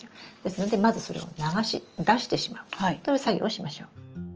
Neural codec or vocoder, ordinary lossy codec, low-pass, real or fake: none; Opus, 24 kbps; 7.2 kHz; real